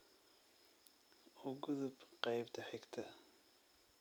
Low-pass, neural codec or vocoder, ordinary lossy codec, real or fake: none; none; none; real